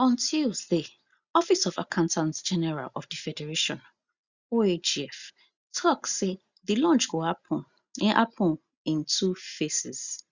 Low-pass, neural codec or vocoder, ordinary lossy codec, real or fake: 7.2 kHz; none; Opus, 64 kbps; real